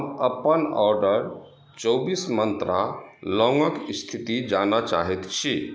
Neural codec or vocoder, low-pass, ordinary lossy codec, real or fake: none; none; none; real